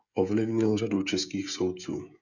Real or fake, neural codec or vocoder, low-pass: fake; codec, 16 kHz, 16 kbps, FreqCodec, smaller model; 7.2 kHz